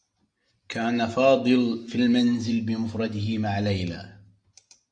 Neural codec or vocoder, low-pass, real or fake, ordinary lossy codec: none; 9.9 kHz; real; Opus, 64 kbps